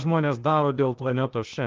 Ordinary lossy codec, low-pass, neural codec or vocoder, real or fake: Opus, 24 kbps; 7.2 kHz; codec, 16 kHz, 0.8 kbps, ZipCodec; fake